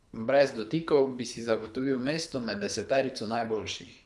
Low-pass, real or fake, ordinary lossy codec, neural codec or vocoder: none; fake; none; codec, 24 kHz, 3 kbps, HILCodec